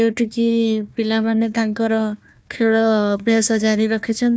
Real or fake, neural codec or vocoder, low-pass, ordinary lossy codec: fake; codec, 16 kHz, 1 kbps, FunCodec, trained on Chinese and English, 50 frames a second; none; none